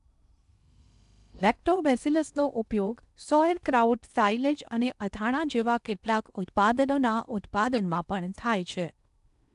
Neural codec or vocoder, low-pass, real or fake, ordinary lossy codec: codec, 16 kHz in and 24 kHz out, 0.8 kbps, FocalCodec, streaming, 65536 codes; 10.8 kHz; fake; none